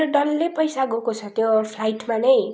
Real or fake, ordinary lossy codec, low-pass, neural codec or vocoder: real; none; none; none